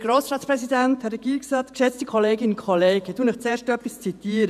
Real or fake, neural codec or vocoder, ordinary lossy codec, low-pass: fake; vocoder, 48 kHz, 128 mel bands, Vocos; none; 14.4 kHz